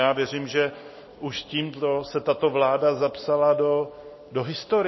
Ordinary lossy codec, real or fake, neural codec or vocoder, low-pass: MP3, 24 kbps; real; none; 7.2 kHz